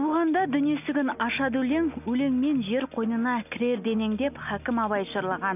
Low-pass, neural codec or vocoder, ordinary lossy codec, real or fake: 3.6 kHz; none; none; real